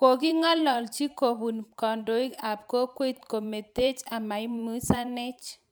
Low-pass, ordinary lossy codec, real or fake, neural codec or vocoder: none; none; fake; vocoder, 44.1 kHz, 128 mel bands every 512 samples, BigVGAN v2